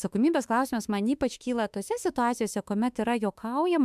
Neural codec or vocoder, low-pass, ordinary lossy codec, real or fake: autoencoder, 48 kHz, 32 numbers a frame, DAC-VAE, trained on Japanese speech; 14.4 kHz; MP3, 96 kbps; fake